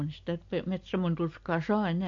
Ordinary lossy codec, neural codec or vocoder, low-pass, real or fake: MP3, 48 kbps; none; 7.2 kHz; real